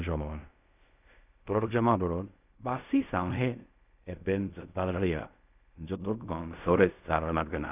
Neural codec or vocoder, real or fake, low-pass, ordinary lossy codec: codec, 16 kHz in and 24 kHz out, 0.4 kbps, LongCat-Audio-Codec, fine tuned four codebook decoder; fake; 3.6 kHz; none